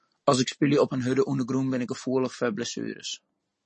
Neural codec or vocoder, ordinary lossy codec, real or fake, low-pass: none; MP3, 32 kbps; real; 10.8 kHz